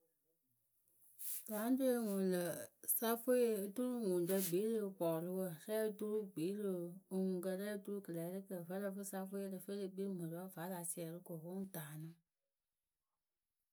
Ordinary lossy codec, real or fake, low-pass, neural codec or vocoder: none; real; none; none